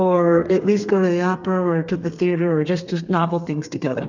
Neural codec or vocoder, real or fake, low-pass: codec, 32 kHz, 1.9 kbps, SNAC; fake; 7.2 kHz